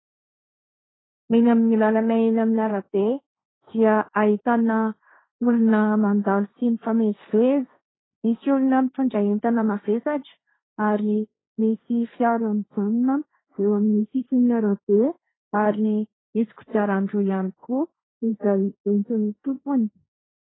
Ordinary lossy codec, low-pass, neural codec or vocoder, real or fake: AAC, 16 kbps; 7.2 kHz; codec, 16 kHz, 1.1 kbps, Voila-Tokenizer; fake